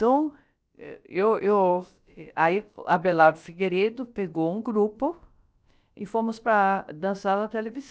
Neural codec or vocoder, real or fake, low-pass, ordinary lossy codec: codec, 16 kHz, about 1 kbps, DyCAST, with the encoder's durations; fake; none; none